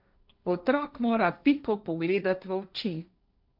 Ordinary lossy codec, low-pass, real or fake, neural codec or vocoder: none; 5.4 kHz; fake; codec, 16 kHz, 1.1 kbps, Voila-Tokenizer